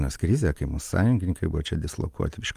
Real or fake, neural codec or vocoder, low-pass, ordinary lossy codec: real; none; 14.4 kHz; Opus, 32 kbps